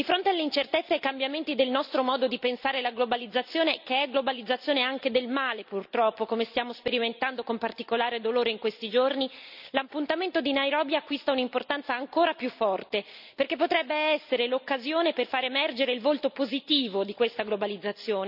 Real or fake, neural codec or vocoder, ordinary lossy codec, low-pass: real; none; none; 5.4 kHz